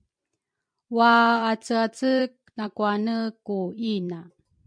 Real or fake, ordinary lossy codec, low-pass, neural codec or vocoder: fake; MP3, 64 kbps; 9.9 kHz; vocoder, 44.1 kHz, 128 mel bands every 256 samples, BigVGAN v2